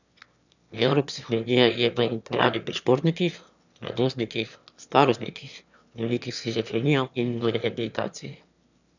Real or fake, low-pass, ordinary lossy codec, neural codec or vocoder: fake; 7.2 kHz; none; autoencoder, 22.05 kHz, a latent of 192 numbers a frame, VITS, trained on one speaker